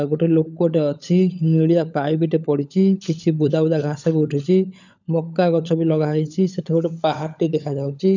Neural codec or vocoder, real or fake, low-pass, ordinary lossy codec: codec, 16 kHz, 16 kbps, FunCodec, trained on LibriTTS, 50 frames a second; fake; 7.2 kHz; none